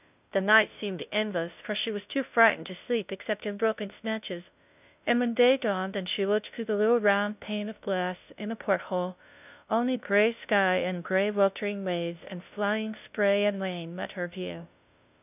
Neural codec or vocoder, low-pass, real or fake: codec, 16 kHz, 0.5 kbps, FunCodec, trained on Chinese and English, 25 frames a second; 3.6 kHz; fake